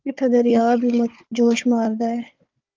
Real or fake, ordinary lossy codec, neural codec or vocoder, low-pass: fake; Opus, 24 kbps; codec, 16 kHz, 4 kbps, FunCodec, trained on Chinese and English, 50 frames a second; 7.2 kHz